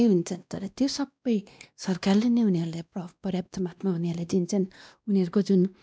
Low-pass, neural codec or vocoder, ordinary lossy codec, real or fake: none; codec, 16 kHz, 1 kbps, X-Codec, WavLM features, trained on Multilingual LibriSpeech; none; fake